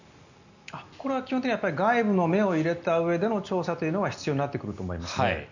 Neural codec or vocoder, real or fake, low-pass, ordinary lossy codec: none; real; 7.2 kHz; none